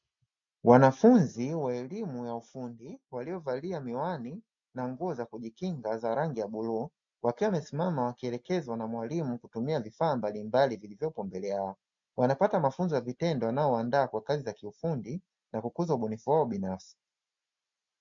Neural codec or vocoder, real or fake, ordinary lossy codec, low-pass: none; real; MP3, 64 kbps; 7.2 kHz